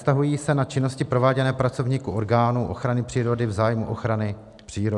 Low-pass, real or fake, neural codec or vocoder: 10.8 kHz; real; none